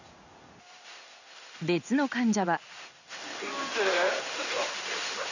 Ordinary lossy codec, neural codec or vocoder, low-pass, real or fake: none; codec, 16 kHz in and 24 kHz out, 1 kbps, XY-Tokenizer; 7.2 kHz; fake